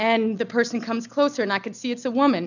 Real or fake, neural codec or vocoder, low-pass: real; none; 7.2 kHz